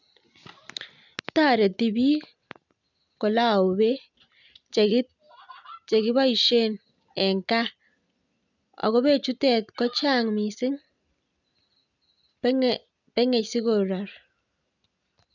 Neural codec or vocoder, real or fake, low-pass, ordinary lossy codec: none; real; 7.2 kHz; none